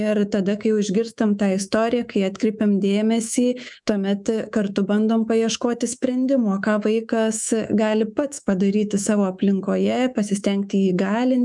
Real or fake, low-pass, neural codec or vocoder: fake; 10.8 kHz; codec, 24 kHz, 3.1 kbps, DualCodec